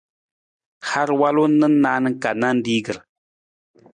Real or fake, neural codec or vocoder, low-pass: real; none; 9.9 kHz